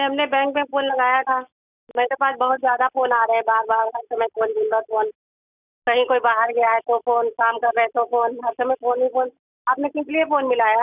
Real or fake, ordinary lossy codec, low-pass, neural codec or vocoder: real; none; 3.6 kHz; none